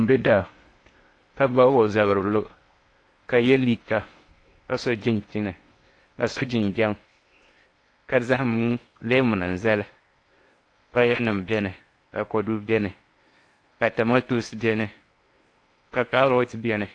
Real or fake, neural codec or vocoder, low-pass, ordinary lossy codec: fake; codec, 16 kHz in and 24 kHz out, 0.8 kbps, FocalCodec, streaming, 65536 codes; 9.9 kHz; AAC, 48 kbps